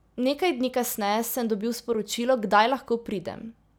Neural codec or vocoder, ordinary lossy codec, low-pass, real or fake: none; none; none; real